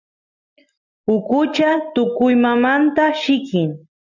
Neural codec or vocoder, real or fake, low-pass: none; real; 7.2 kHz